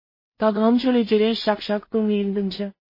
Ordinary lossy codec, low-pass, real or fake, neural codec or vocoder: MP3, 24 kbps; 5.4 kHz; fake; codec, 16 kHz in and 24 kHz out, 0.4 kbps, LongCat-Audio-Codec, two codebook decoder